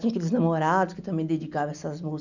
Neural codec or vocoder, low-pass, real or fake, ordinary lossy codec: none; 7.2 kHz; real; none